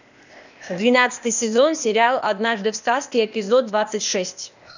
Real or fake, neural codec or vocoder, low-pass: fake; codec, 16 kHz, 0.8 kbps, ZipCodec; 7.2 kHz